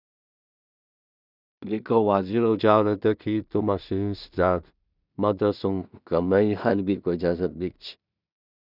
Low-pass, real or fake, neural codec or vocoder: 5.4 kHz; fake; codec, 16 kHz in and 24 kHz out, 0.4 kbps, LongCat-Audio-Codec, two codebook decoder